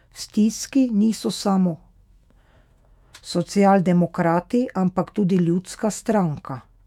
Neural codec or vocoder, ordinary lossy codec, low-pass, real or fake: autoencoder, 48 kHz, 128 numbers a frame, DAC-VAE, trained on Japanese speech; none; 19.8 kHz; fake